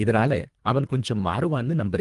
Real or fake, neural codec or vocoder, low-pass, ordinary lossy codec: fake; codec, 24 kHz, 1.5 kbps, HILCodec; 10.8 kHz; Opus, 32 kbps